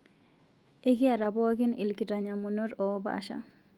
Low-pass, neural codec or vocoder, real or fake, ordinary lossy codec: 19.8 kHz; autoencoder, 48 kHz, 128 numbers a frame, DAC-VAE, trained on Japanese speech; fake; Opus, 32 kbps